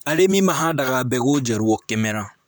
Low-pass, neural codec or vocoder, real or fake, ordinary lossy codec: none; vocoder, 44.1 kHz, 128 mel bands, Pupu-Vocoder; fake; none